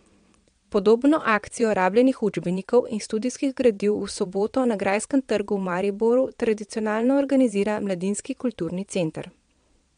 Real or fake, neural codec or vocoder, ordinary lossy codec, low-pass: fake; vocoder, 22.05 kHz, 80 mel bands, Vocos; MP3, 64 kbps; 9.9 kHz